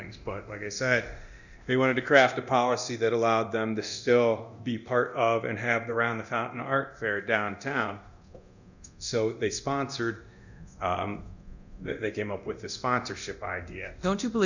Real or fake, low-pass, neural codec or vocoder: fake; 7.2 kHz; codec, 24 kHz, 0.9 kbps, DualCodec